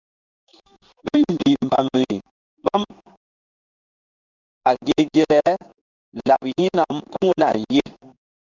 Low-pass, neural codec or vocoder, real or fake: 7.2 kHz; codec, 16 kHz in and 24 kHz out, 1 kbps, XY-Tokenizer; fake